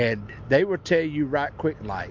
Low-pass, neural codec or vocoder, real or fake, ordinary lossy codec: 7.2 kHz; none; real; MP3, 48 kbps